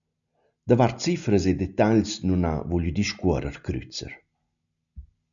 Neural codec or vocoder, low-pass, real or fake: none; 7.2 kHz; real